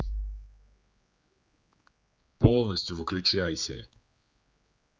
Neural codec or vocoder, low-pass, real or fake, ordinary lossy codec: codec, 16 kHz, 4 kbps, X-Codec, HuBERT features, trained on general audio; none; fake; none